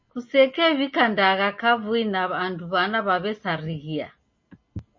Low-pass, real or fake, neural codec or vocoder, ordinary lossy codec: 7.2 kHz; real; none; MP3, 32 kbps